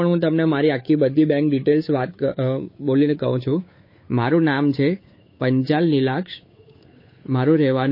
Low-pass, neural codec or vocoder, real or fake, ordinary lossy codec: 5.4 kHz; codec, 16 kHz, 8 kbps, FunCodec, trained on Chinese and English, 25 frames a second; fake; MP3, 24 kbps